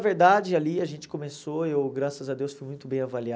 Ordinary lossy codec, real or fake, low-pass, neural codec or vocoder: none; real; none; none